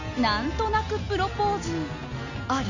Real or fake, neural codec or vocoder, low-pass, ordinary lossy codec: real; none; 7.2 kHz; none